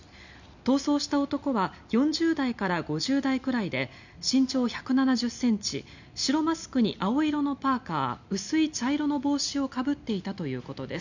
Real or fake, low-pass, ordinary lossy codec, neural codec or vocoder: real; 7.2 kHz; none; none